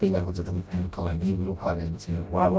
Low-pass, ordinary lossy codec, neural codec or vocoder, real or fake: none; none; codec, 16 kHz, 0.5 kbps, FreqCodec, smaller model; fake